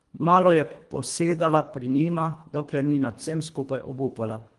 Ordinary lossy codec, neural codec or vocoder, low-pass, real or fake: Opus, 24 kbps; codec, 24 kHz, 1.5 kbps, HILCodec; 10.8 kHz; fake